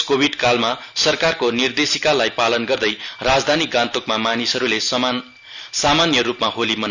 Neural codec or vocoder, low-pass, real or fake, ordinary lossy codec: none; 7.2 kHz; real; none